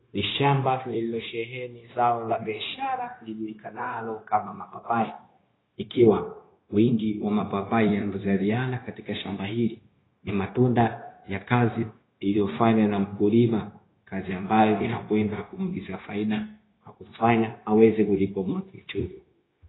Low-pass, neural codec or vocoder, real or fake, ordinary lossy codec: 7.2 kHz; codec, 16 kHz, 0.9 kbps, LongCat-Audio-Codec; fake; AAC, 16 kbps